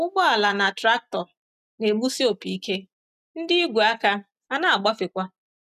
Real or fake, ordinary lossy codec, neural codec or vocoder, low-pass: real; none; none; 14.4 kHz